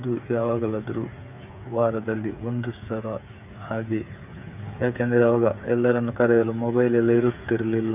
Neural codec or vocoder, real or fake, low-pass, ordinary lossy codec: codec, 16 kHz, 8 kbps, FreqCodec, smaller model; fake; 3.6 kHz; none